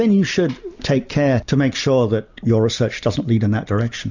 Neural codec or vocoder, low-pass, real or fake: none; 7.2 kHz; real